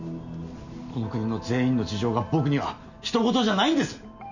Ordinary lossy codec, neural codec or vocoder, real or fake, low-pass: none; none; real; 7.2 kHz